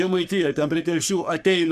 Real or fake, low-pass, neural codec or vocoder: fake; 14.4 kHz; codec, 44.1 kHz, 3.4 kbps, Pupu-Codec